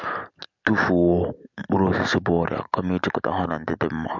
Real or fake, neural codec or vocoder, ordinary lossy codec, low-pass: real; none; MP3, 64 kbps; 7.2 kHz